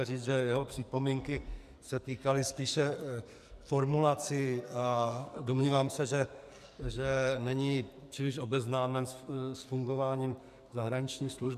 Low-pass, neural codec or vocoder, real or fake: 14.4 kHz; codec, 44.1 kHz, 2.6 kbps, SNAC; fake